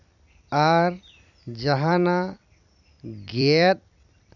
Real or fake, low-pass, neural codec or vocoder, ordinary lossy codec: fake; 7.2 kHz; vocoder, 44.1 kHz, 128 mel bands every 256 samples, BigVGAN v2; none